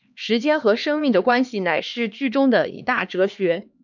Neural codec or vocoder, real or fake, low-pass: codec, 16 kHz, 2 kbps, X-Codec, HuBERT features, trained on LibriSpeech; fake; 7.2 kHz